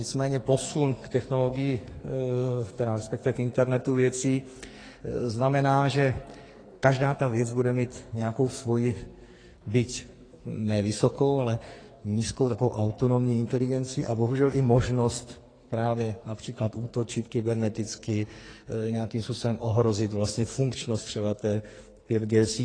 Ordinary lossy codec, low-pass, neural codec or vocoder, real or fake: AAC, 32 kbps; 9.9 kHz; codec, 44.1 kHz, 2.6 kbps, SNAC; fake